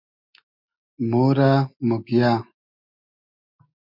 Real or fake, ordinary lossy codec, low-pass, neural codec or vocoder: real; MP3, 48 kbps; 5.4 kHz; none